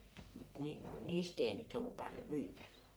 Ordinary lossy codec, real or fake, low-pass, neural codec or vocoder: none; fake; none; codec, 44.1 kHz, 1.7 kbps, Pupu-Codec